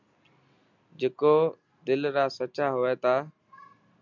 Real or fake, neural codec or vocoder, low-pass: real; none; 7.2 kHz